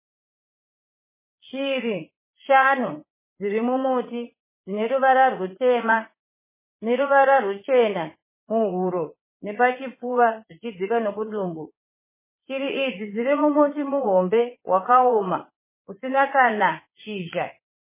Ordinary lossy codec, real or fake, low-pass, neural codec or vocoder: MP3, 16 kbps; fake; 3.6 kHz; vocoder, 22.05 kHz, 80 mel bands, Vocos